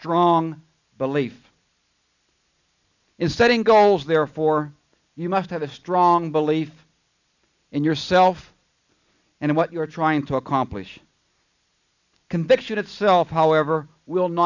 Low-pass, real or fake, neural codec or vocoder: 7.2 kHz; real; none